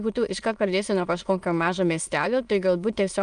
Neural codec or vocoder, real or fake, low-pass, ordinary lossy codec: autoencoder, 22.05 kHz, a latent of 192 numbers a frame, VITS, trained on many speakers; fake; 9.9 kHz; Opus, 32 kbps